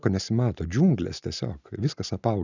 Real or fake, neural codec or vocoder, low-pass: real; none; 7.2 kHz